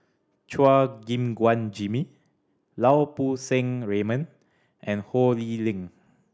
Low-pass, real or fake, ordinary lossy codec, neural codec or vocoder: none; real; none; none